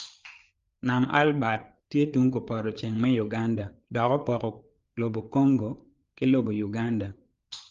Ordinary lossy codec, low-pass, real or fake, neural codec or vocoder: Opus, 32 kbps; 7.2 kHz; fake; codec, 16 kHz, 4 kbps, FreqCodec, larger model